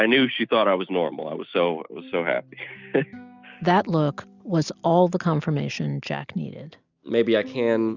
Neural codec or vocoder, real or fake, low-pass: none; real; 7.2 kHz